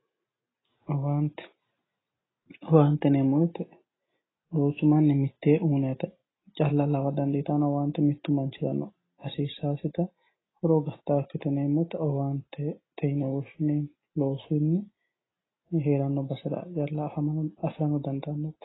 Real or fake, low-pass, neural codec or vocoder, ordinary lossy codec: real; 7.2 kHz; none; AAC, 16 kbps